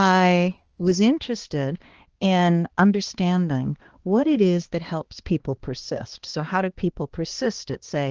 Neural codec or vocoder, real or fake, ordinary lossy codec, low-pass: codec, 16 kHz, 2 kbps, X-Codec, HuBERT features, trained on balanced general audio; fake; Opus, 16 kbps; 7.2 kHz